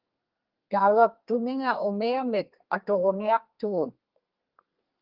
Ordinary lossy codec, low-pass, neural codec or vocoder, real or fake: Opus, 32 kbps; 5.4 kHz; codec, 32 kHz, 1.9 kbps, SNAC; fake